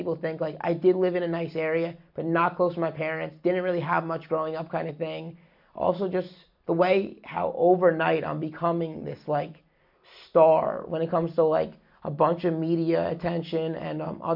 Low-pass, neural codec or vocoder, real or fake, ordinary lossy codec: 5.4 kHz; none; real; MP3, 48 kbps